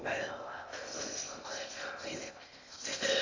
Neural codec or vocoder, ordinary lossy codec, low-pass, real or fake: codec, 16 kHz in and 24 kHz out, 0.6 kbps, FocalCodec, streaming, 2048 codes; AAC, 48 kbps; 7.2 kHz; fake